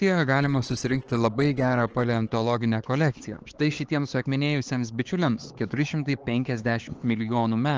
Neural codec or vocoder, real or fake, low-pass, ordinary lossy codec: codec, 16 kHz, 4 kbps, X-Codec, HuBERT features, trained on LibriSpeech; fake; 7.2 kHz; Opus, 16 kbps